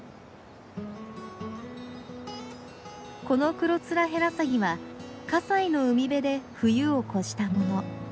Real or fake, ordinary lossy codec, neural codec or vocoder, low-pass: real; none; none; none